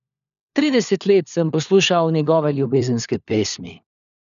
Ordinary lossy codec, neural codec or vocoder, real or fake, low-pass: none; codec, 16 kHz, 4 kbps, FunCodec, trained on LibriTTS, 50 frames a second; fake; 7.2 kHz